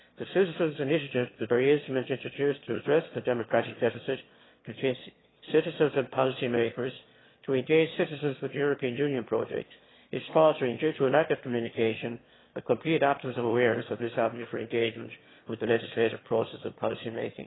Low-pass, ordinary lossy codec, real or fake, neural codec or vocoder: 7.2 kHz; AAC, 16 kbps; fake; autoencoder, 22.05 kHz, a latent of 192 numbers a frame, VITS, trained on one speaker